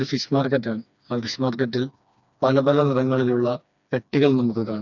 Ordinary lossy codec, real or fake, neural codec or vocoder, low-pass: none; fake; codec, 16 kHz, 2 kbps, FreqCodec, smaller model; 7.2 kHz